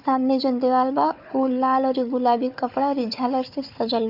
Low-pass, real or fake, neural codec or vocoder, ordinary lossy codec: 5.4 kHz; fake; codec, 16 kHz, 4 kbps, FunCodec, trained on Chinese and English, 50 frames a second; none